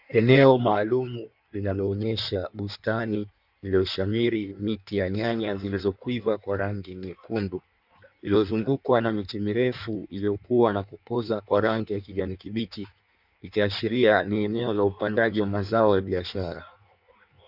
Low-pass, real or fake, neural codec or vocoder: 5.4 kHz; fake; codec, 16 kHz in and 24 kHz out, 1.1 kbps, FireRedTTS-2 codec